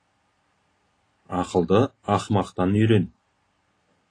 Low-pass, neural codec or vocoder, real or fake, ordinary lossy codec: 9.9 kHz; none; real; AAC, 32 kbps